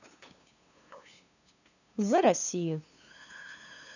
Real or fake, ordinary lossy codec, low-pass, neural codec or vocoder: fake; none; 7.2 kHz; codec, 16 kHz, 2 kbps, FunCodec, trained on LibriTTS, 25 frames a second